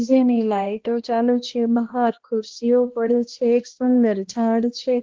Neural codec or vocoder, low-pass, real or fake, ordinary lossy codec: codec, 16 kHz, 1 kbps, X-Codec, HuBERT features, trained on balanced general audio; 7.2 kHz; fake; Opus, 16 kbps